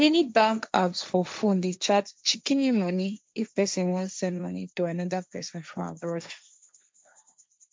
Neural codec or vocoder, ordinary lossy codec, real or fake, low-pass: codec, 16 kHz, 1.1 kbps, Voila-Tokenizer; none; fake; none